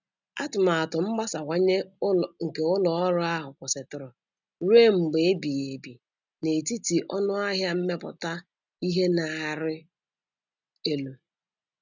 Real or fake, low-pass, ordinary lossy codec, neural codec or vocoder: real; 7.2 kHz; none; none